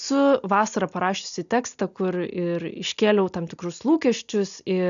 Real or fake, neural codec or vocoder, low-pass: real; none; 7.2 kHz